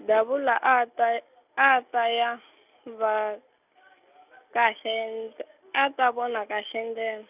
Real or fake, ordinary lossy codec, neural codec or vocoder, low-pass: real; none; none; 3.6 kHz